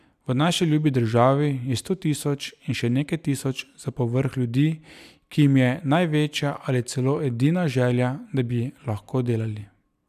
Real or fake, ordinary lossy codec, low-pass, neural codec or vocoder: real; none; 14.4 kHz; none